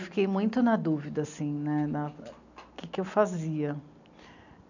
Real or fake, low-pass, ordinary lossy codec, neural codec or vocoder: real; 7.2 kHz; none; none